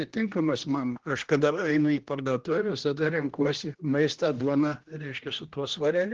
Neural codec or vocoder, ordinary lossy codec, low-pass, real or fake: codec, 16 kHz, 1 kbps, X-Codec, HuBERT features, trained on general audio; Opus, 16 kbps; 7.2 kHz; fake